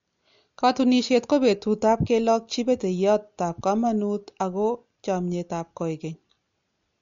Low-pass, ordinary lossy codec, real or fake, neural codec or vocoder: 7.2 kHz; MP3, 48 kbps; real; none